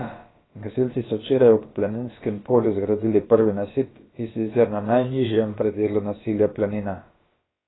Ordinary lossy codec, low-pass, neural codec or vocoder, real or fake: AAC, 16 kbps; 7.2 kHz; codec, 16 kHz, about 1 kbps, DyCAST, with the encoder's durations; fake